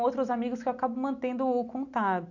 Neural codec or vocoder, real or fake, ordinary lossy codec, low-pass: none; real; none; 7.2 kHz